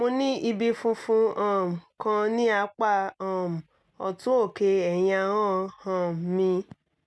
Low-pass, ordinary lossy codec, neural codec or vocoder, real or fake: none; none; none; real